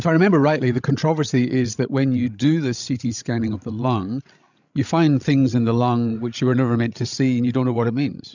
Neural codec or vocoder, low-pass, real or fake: codec, 16 kHz, 16 kbps, FreqCodec, larger model; 7.2 kHz; fake